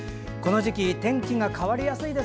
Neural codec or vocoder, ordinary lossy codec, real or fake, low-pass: none; none; real; none